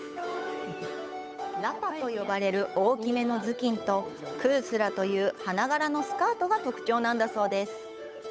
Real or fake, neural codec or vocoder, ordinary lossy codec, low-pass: fake; codec, 16 kHz, 8 kbps, FunCodec, trained on Chinese and English, 25 frames a second; none; none